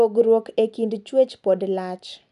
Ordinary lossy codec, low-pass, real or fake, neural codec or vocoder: none; 10.8 kHz; real; none